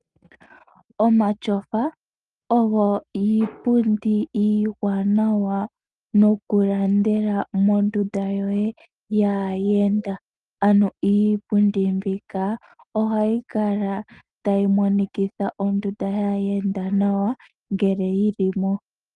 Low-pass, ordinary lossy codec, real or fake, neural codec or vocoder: 10.8 kHz; Opus, 32 kbps; real; none